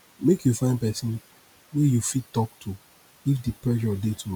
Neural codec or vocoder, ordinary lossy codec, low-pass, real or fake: none; none; none; real